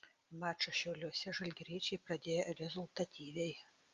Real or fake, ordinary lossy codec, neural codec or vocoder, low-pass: real; Opus, 32 kbps; none; 7.2 kHz